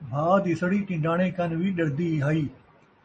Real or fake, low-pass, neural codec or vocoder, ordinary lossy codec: real; 10.8 kHz; none; MP3, 32 kbps